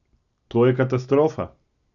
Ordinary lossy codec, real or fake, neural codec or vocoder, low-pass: none; real; none; 7.2 kHz